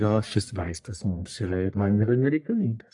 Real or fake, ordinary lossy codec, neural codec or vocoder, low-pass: fake; AAC, 64 kbps; codec, 44.1 kHz, 1.7 kbps, Pupu-Codec; 10.8 kHz